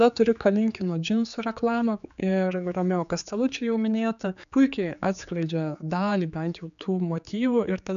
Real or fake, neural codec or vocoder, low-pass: fake; codec, 16 kHz, 4 kbps, X-Codec, HuBERT features, trained on general audio; 7.2 kHz